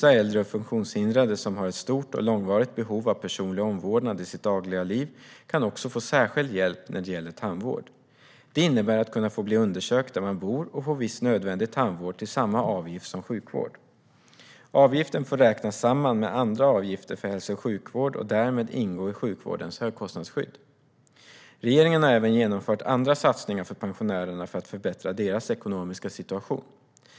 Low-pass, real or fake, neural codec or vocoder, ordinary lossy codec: none; real; none; none